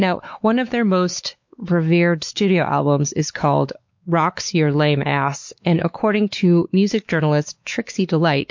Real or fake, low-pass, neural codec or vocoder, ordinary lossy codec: fake; 7.2 kHz; codec, 16 kHz, 4 kbps, X-Codec, WavLM features, trained on Multilingual LibriSpeech; MP3, 48 kbps